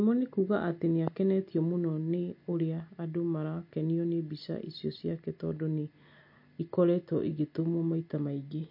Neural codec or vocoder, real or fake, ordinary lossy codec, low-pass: none; real; MP3, 32 kbps; 5.4 kHz